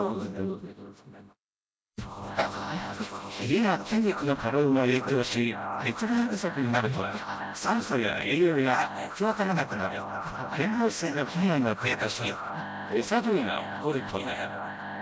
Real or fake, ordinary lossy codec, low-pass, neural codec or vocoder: fake; none; none; codec, 16 kHz, 0.5 kbps, FreqCodec, smaller model